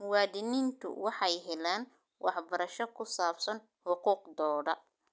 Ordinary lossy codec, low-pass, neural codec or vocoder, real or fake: none; none; none; real